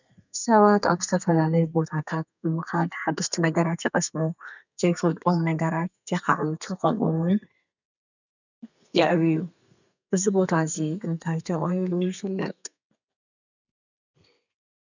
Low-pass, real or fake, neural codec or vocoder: 7.2 kHz; fake; codec, 32 kHz, 1.9 kbps, SNAC